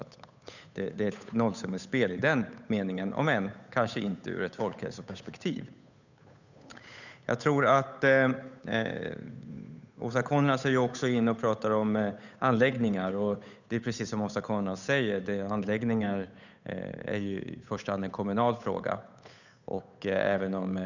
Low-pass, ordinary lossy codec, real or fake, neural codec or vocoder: 7.2 kHz; none; fake; codec, 16 kHz, 8 kbps, FunCodec, trained on Chinese and English, 25 frames a second